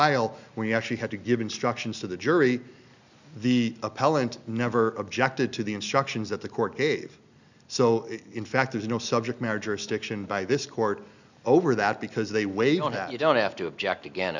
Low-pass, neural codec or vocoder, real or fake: 7.2 kHz; none; real